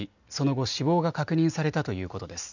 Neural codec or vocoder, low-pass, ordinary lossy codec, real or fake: none; 7.2 kHz; none; real